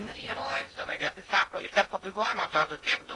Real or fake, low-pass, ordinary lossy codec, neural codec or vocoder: fake; 10.8 kHz; AAC, 32 kbps; codec, 16 kHz in and 24 kHz out, 0.6 kbps, FocalCodec, streaming, 4096 codes